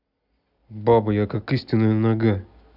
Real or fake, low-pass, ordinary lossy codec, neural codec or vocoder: real; 5.4 kHz; none; none